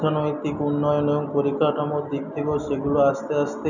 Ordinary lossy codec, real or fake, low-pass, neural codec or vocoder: none; real; 7.2 kHz; none